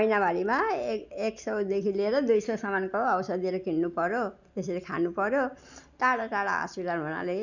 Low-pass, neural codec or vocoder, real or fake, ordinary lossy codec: 7.2 kHz; none; real; none